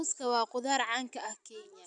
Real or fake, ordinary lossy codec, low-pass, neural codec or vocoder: real; none; 9.9 kHz; none